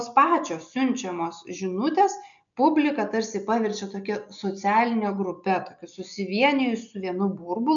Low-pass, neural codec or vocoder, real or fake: 7.2 kHz; none; real